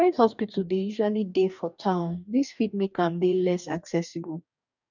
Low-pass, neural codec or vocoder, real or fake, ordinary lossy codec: 7.2 kHz; codec, 44.1 kHz, 2.6 kbps, DAC; fake; none